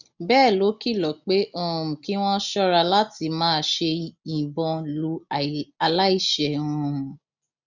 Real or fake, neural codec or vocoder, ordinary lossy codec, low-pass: real; none; none; 7.2 kHz